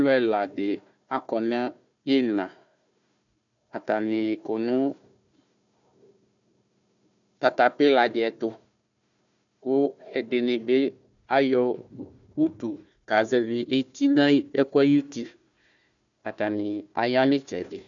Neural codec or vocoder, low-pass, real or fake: codec, 16 kHz, 1 kbps, FunCodec, trained on Chinese and English, 50 frames a second; 7.2 kHz; fake